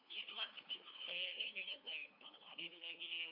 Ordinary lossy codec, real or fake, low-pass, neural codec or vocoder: none; fake; 5.4 kHz; codec, 16 kHz, 2 kbps, FunCodec, trained on LibriTTS, 25 frames a second